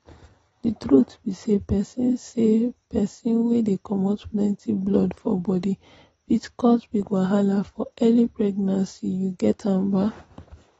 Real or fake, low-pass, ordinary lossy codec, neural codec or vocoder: real; 19.8 kHz; AAC, 24 kbps; none